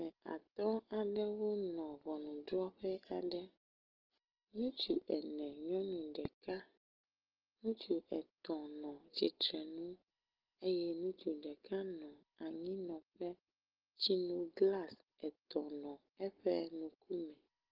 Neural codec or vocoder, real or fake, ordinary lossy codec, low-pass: none; real; Opus, 16 kbps; 5.4 kHz